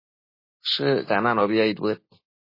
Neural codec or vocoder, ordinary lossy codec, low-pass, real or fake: none; MP3, 24 kbps; 5.4 kHz; real